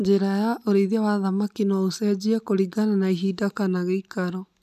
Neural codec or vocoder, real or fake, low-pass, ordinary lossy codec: none; real; 14.4 kHz; none